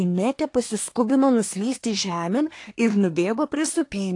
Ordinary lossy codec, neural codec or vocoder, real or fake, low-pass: AAC, 48 kbps; codec, 24 kHz, 1 kbps, SNAC; fake; 10.8 kHz